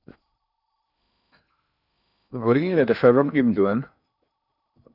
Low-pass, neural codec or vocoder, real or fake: 5.4 kHz; codec, 16 kHz in and 24 kHz out, 0.6 kbps, FocalCodec, streaming, 2048 codes; fake